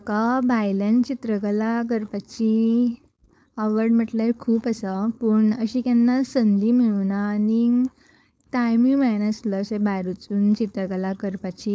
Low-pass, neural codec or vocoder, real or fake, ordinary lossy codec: none; codec, 16 kHz, 4.8 kbps, FACodec; fake; none